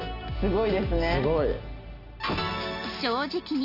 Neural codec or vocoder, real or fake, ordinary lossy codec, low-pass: none; real; AAC, 48 kbps; 5.4 kHz